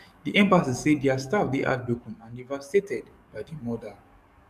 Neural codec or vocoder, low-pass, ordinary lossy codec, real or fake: codec, 44.1 kHz, 7.8 kbps, DAC; 14.4 kHz; none; fake